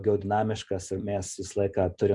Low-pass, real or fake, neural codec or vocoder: 10.8 kHz; real; none